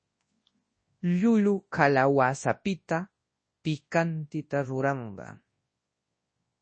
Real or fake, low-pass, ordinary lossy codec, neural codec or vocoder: fake; 9.9 kHz; MP3, 32 kbps; codec, 24 kHz, 0.9 kbps, WavTokenizer, large speech release